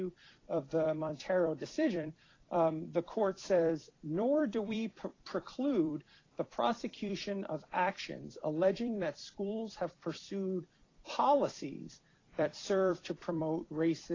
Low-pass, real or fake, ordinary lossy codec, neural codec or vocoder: 7.2 kHz; fake; AAC, 32 kbps; vocoder, 44.1 kHz, 128 mel bands every 256 samples, BigVGAN v2